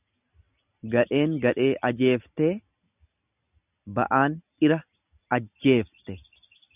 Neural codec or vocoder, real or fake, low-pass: none; real; 3.6 kHz